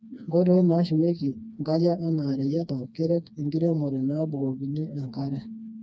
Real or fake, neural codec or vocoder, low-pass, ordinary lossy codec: fake; codec, 16 kHz, 2 kbps, FreqCodec, smaller model; none; none